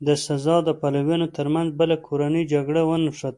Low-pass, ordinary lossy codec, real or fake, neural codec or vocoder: 10.8 kHz; MP3, 64 kbps; real; none